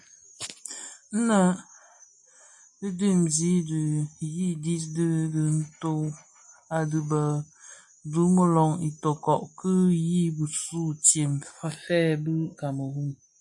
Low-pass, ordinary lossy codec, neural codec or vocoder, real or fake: 10.8 kHz; MP3, 48 kbps; none; real